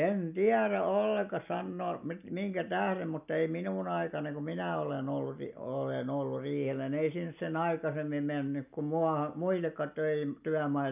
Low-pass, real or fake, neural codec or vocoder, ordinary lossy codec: 3.6 kHz; real; none; none